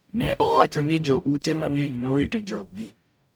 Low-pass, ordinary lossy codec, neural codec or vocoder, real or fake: none; none; codec, 44.1 kHz, 0.9 kbps, DAC; fake